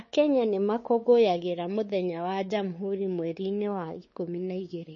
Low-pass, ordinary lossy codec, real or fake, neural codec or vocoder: 7.2 kHz; MP3, 32 kbps; fake; codec, 16 kHz, 4 kbps, X-Codec, WavLM features, trained on Multilingual LibriSpeech